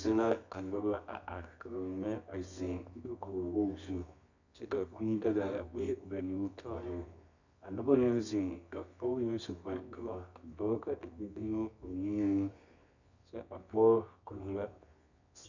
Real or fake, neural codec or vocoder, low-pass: fake; codec, 24 kHz, 0.9 kbps, WavTokenizer, medium music audio release; 7.2 kHz